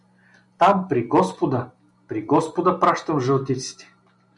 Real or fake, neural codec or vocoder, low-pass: real; none; 10.8 kHz